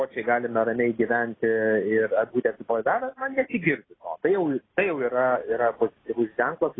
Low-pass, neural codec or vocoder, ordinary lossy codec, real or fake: 7.2 kHz; none; AAC, 16 kbps; real